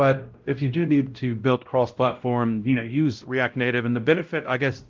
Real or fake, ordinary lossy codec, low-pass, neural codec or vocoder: fake; Opus, 32 kbps; 7.2 kHz; codec, 16 kHz, 0.5 kbps, X-Codec, WavLM features, trained on Multilingual LibriSpeech